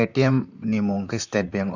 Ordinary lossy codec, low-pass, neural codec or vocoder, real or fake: none; 7.2 kHz; vocoder, 44.1 kHz, 128 mel bands, Pupu-Vocoder; fake